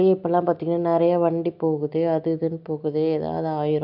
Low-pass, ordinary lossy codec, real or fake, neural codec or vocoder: 5.4 kHz; MP3, 48 kbps; real; none